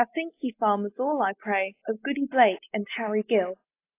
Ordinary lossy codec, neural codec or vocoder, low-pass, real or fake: AAC, 24 kbps; none; 3.6 kHz; real